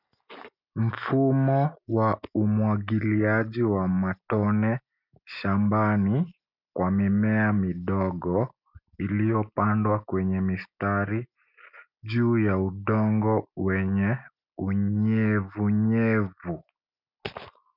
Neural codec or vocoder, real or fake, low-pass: none; real; 5.4 kHz